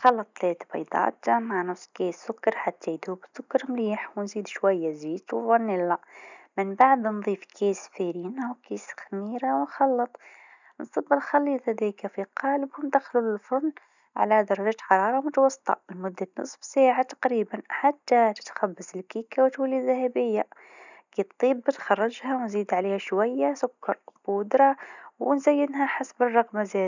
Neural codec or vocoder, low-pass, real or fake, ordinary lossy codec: none; 7.2 kHz; real; none